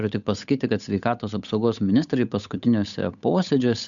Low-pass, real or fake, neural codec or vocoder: 7.2 kHz; fake; codec, 16 kHz, 8 kbps, FunCodec, trained on Chinese and English, 25 frames a second